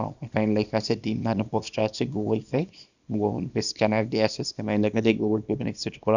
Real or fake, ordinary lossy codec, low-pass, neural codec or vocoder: fake; none; 7.2 kHz; codec, 24 kHz, 0.9 kbps, WavTokenizer, small release